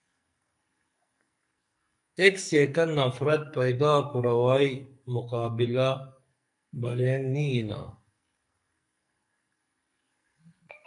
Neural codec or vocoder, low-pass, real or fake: codec, 44.1 kHz, 2.6 kbps, SNAC; 10.8 kHz; fake